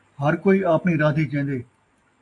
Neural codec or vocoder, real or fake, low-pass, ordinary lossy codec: none; real; 10.8 kHz; AAC, 48 kbps